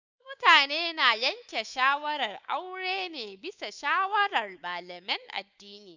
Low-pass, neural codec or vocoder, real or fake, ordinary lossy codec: 7.2 kHz; codec, 24 kHz, 3.1 kbps, DualCodec; fake; Opus, 64 kbps